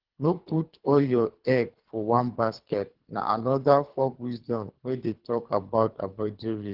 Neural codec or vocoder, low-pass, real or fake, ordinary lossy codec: codec, 24 kHz, 3 kbps, HILCodec; 5.4 kHz; fake; Opus, 16 kbps